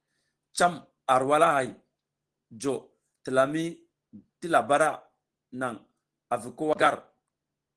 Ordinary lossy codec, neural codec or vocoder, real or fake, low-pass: Opus, 16 kbps; none; real; 10.8 kHz